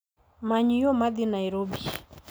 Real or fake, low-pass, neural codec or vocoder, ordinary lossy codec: real; none; none; none